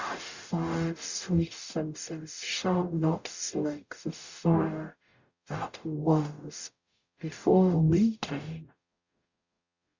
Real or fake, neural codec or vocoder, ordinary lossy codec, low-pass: fake; codec, 44.1 kHz, 0.9 kbps, DAC; Opus, 64 kbps; 7.2 kHz